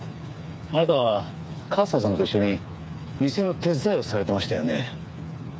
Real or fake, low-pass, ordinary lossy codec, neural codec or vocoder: fake; none; none; codec, 16 kHz, 4 kbps, FreqCodec, smaller model